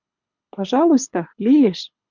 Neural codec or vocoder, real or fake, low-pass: codec, 24 kHz, 6 kbps, HILCodec; fake; 7.2 kHz